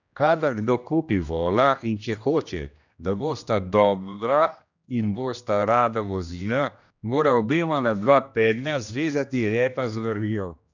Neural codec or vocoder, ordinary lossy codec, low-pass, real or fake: codec, 16 kHz, 1 kbps, X-Codec, HuBERT features, trained on general audio; none; 7.2 kHz; fake